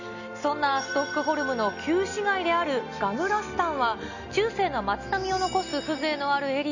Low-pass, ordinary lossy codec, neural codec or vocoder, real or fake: 7.2 kHz; none; none; real